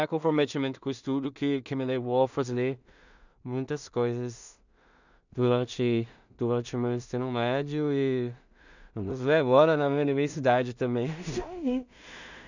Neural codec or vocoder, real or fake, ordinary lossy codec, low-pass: codec, 16 kHz in and 24 kHz out, 0.4 kbps, LongCat-Audio-Codec, two codebook decoder; fake; none; 7.2 kHz